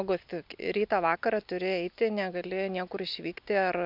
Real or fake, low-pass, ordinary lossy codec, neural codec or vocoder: real; 5.4 kHz; MP3, 48 kbps; none